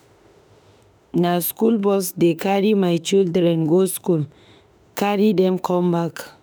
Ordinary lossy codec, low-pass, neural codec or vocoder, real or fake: none; none; autoencoder, 48 kHz, 32 numbers a frame, DAC-VAE, trained on Japanese speech; fake